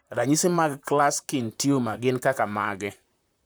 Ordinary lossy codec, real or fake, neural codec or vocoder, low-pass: none; fake; vocoder, 44.1 kHz, 128 mel bands, Pupu-Vocoder; none